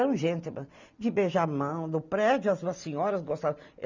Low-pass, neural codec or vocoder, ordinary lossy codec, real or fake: 7.2 kHz; none; none; real